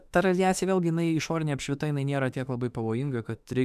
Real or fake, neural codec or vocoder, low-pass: fake; autoencoder, 48 kHz, 32 numbers a frame, DAC-VAE, trained on Japanese speech; 14.4 kHz